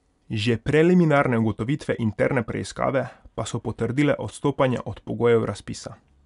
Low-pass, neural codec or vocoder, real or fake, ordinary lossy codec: 10.8 kHz; none; real; none